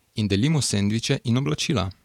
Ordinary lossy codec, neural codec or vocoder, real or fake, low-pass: none; vocoder, 48 kHz, 128 mel bands, Vocos; fake; 19.8 kHz